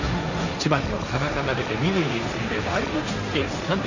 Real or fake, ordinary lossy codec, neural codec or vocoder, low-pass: fake; none; codec, 16 kHz, 1.1 kbps, Voila-Tokenizer; 7.2 kHz